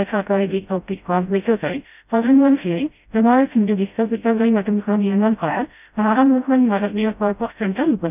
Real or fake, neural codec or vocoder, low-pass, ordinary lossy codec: fake; codec, 16 kHz, 0.5 kbps, FreqCodec, smaller model; 3.6 kHz; none